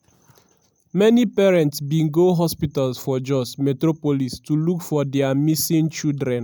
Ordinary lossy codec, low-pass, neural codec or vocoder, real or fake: none; none; none; real